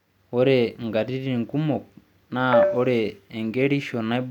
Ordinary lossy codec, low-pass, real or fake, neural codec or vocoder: none; 19.8 kHz; real; none